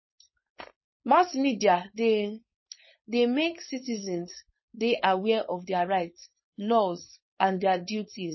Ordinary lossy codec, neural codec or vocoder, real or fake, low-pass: MP3, 24 kbps; codec, 16 kHz, 4.8 kbps, FACodec; fake; 7.2 kHz